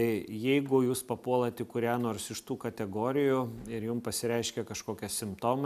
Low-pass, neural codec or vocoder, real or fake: 14.4 kHz; none; real